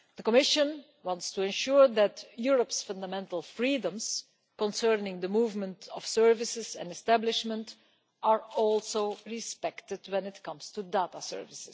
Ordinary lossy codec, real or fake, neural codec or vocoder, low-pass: none; real; none; none